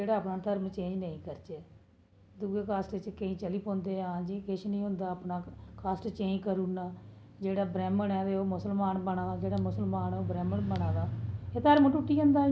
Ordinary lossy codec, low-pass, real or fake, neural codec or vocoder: none; none; real; none